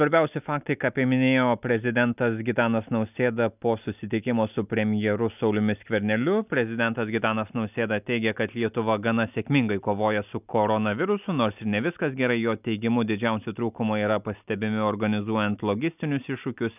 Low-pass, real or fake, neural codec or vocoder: 3.6 kHz; real; none